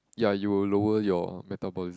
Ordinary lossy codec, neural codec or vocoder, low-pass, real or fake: none; none; none; real